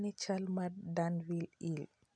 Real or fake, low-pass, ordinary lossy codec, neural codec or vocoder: real; 9.9 kHz; none; none